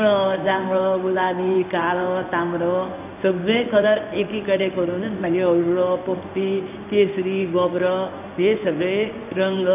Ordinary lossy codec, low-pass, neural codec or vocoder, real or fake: none; 3.6 kHz; codec, 16 kHz in and 24 kHz out, 1 kbps, XY-Tokenizer; fake